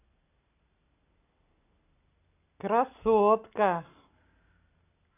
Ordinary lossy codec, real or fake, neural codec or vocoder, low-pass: none; real; none; 3.6 kHz